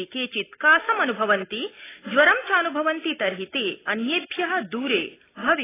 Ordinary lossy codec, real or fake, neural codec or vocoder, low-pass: AAC, 16 kbps; real; none; 3.6 kHz